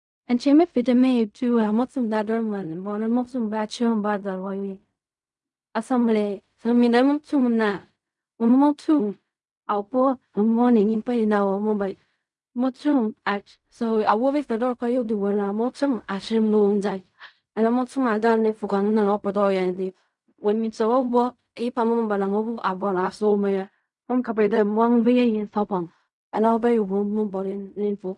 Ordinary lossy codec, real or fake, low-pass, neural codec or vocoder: none; fake; 10.8 kHz; codec, 16 kHz in and 24 kHz out, 0.4 kbps, LongCat-Audio-Codec, fine tuned four codebook decoder